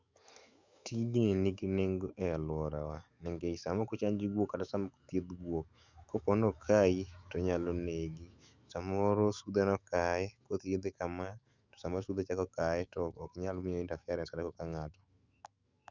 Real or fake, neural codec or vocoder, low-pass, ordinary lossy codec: fake; autoencoder, 48 kHz, 128 numbers a frame, DAC-VAE, trained on Japanese speech; 7.2 kHz; Opus, 64 kbps